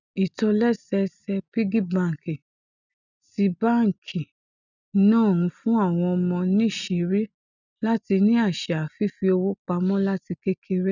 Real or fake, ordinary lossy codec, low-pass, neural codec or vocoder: real; none; 7.2 kHz; none